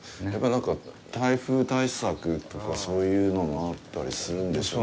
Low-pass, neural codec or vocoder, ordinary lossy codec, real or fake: none; none; none; real